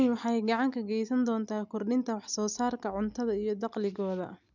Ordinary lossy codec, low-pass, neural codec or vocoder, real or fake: none; 7.2 kHz; none; real